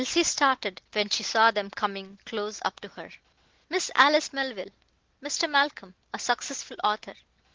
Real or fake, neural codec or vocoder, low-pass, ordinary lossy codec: real; none; 7.2 kHz; Opus, 16 kbps